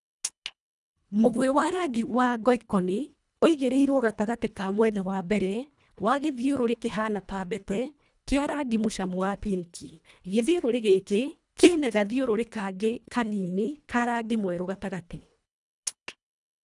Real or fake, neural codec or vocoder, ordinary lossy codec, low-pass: fake; codec, 24 kHz, 1.5 kbps, HILCodec; none; 10.8 kHz